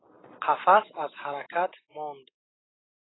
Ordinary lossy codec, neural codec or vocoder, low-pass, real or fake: AAC, 16 kbps; none; 7.2 kHz; real